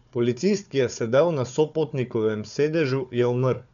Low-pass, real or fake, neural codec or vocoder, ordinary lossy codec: 7.2 kHz; fake; codec, 16 kHz, 4 kbps, FunCodec, trained on Chinese and English, 50 frames a second; none